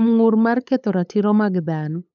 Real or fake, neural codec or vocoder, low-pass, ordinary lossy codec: fake; codec, 16 kHz, 8 kbps, FunCodec, trained on LibriTTS, 25 frames a second; 7.2 kHz; none